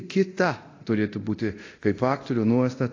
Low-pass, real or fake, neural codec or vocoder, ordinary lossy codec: 7.2 kHz; fake; codec, 24 kHz, 0.9 kbps, DualCodec; AAC, 32 kbps